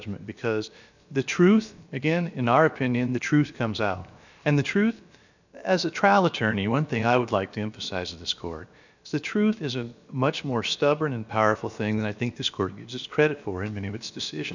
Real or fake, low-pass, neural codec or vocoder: fake; 7.2 kHz; codec, 16 kHz, about 1 kbps, DyCAST, with the encoder's durations